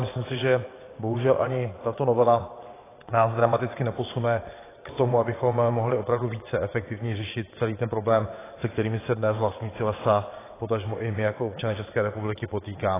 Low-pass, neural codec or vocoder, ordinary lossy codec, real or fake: 3.6 kHz; vocoder, 22.05 kHz, 80 mel bands, WaveNeXt; AAC, 16 kbps; fake